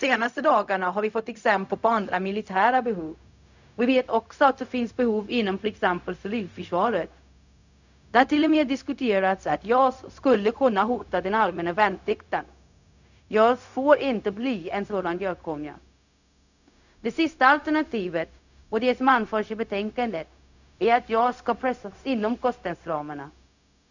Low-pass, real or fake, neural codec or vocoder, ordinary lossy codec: 7.2 kHz; fake; codec, 16 kHz, 0.4 kbps, LongCat-Audio-Codec; none